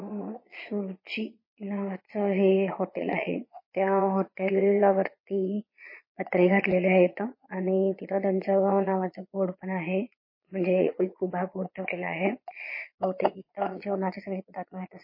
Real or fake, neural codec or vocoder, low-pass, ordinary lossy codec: fake; vocoder, 22.05 kHz, 80 mel bands, Vocos; 5.4 kHz; MP3, 24 kbps